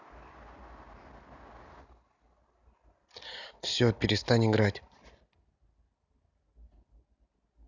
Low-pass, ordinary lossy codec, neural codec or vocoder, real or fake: 7.2 kHz; none; none; real